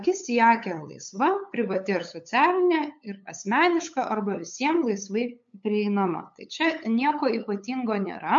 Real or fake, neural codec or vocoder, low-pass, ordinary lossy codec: fake; codec, 16 kHz, 8 kbps, FunCodec, trained on LibriTTS, 25 frames a second; 7.2 kHz; MP3, 48 kbps